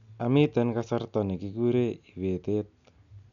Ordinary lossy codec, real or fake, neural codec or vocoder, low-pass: none; real; none; 7.2 kHz